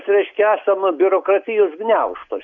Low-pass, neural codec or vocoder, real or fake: 7.2 kHz; none; real